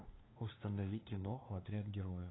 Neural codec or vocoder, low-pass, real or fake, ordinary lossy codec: codec, 16 kHz, 2 kbps, FunCodec, trained on LibriTTS, 25 frames a second; 7.2 kHz; fake; AAC, 16 kbps